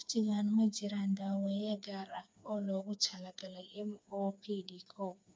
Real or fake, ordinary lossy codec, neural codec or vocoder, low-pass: fake; none; codec, 16 kHz, 4 kbps, FreqCodec, smaller model; none